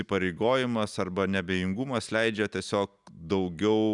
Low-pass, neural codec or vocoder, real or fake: 10.8 kHz; none; real